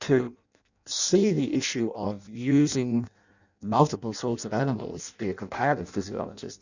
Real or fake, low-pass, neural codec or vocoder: fake; 7.2 kHz; codec, 16 kHz in and 24 kHz out, 0.6 kbps, FireRedTTS-2 codec